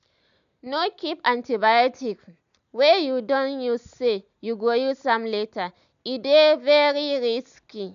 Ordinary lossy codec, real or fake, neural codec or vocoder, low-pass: none; real; none; 7.2 kHz